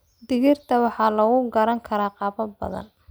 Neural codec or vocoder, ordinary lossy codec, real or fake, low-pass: none; none; real; none